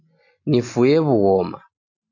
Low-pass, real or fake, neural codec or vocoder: 7.2 kHz; real; none